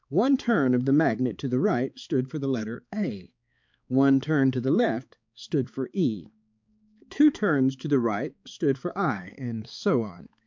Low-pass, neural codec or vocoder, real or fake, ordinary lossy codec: 7.2 kHz; codec, 16 kHz, 4 kbps, X-Codec, HuBERT features, trained on balanced general audio; fake; MP3, 64 kbps